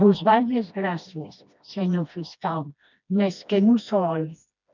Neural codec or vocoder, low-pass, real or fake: codec, 16 kHz, 1 kbps, FreqCodec, smaller model; 7.2 kHz; fake